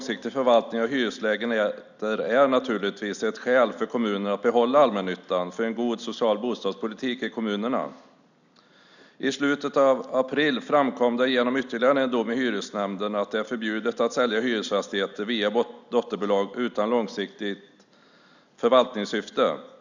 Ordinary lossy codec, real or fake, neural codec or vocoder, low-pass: none; real; none; 7.2 kHz